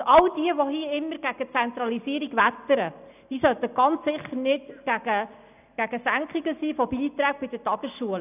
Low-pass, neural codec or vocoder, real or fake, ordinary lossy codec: 3.6 kHz; none; real; none